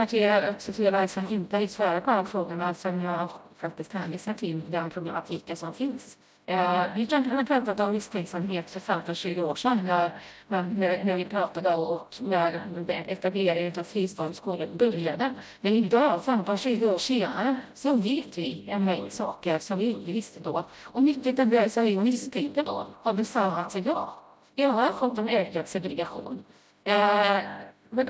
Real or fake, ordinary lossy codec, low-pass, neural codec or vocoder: fake; none; none; codec, 16 kHz, 0.5 kbps, FreqCodec, smaller model